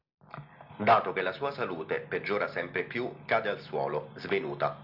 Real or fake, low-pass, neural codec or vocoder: real; 5.4 kHz; none